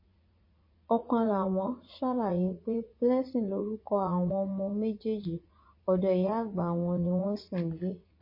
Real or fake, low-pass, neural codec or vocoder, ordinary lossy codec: fake; 5.4 kHz; vocoder, 44.1 kHz, 80 mel bands, Vocos; MP3, 24 kbps